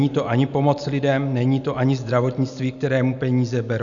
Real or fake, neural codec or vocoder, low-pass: real; none; 7.2 kHz